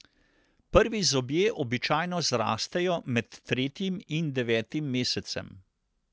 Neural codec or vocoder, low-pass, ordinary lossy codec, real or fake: none; none; none; real